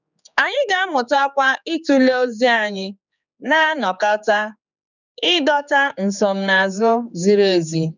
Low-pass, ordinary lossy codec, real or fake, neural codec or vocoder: 7.2 kHz; none; fake; codec, 16 kHz, 4 kbps, X-Codec, HuBERT features, trained on general audio